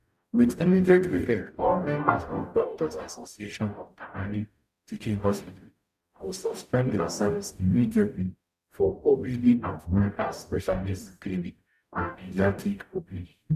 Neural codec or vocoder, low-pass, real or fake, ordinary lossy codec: codec, 44.1 kHz, 0.9 kbps, DAC; 14.4 kHz; fake; none